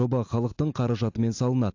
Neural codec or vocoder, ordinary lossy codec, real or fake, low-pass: none; AAC, 48 kbps; real; 7.2 kHz